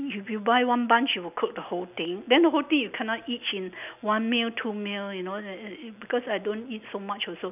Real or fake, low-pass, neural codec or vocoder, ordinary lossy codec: real; 3.6 kHz; none; none